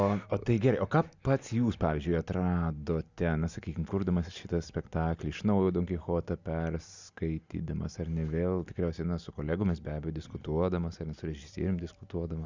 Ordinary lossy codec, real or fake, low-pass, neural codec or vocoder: Opus, 64 kbps; real; 7.2 kHz; none